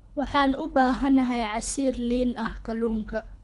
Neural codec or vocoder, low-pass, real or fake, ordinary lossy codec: codec, 24 kHz, 3 kbps, HILCodec; 10.8 kHz; fake; none